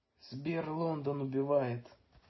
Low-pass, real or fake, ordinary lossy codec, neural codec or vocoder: 7.2 kHz; real; MP3, 24 kbps; none